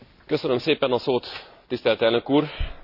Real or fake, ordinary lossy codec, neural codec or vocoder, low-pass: real; none; none; 5.4 kHz